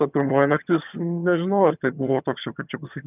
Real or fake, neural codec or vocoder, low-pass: fake; vocoder, 22.05 kHz, 80 mel bands, HiFi-GAN; 3.6 kHz